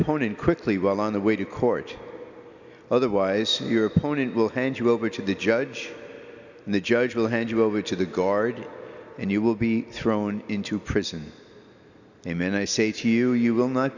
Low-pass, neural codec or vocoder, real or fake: 7.2 kHz; none; real